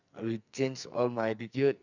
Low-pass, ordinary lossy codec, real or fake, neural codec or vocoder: 7.2 kHz; none; fake; codec, 44.1 kHz, 2.6 kbps, SNAC